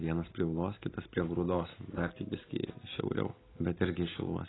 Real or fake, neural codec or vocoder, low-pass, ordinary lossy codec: real; none; 7.2 kHz; AAC, 16 kbps